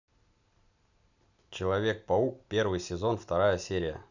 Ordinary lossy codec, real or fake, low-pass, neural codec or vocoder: none; real; 7.2 kHz; none